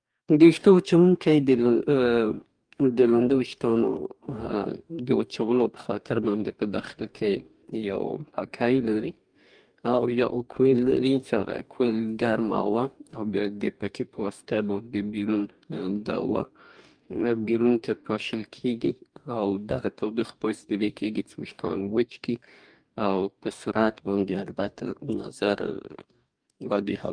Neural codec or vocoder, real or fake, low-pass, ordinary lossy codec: codec, 44.1 kHz, 2.6 kbps, DAC; fake; 9.9 kHz; Opus, 32 kbps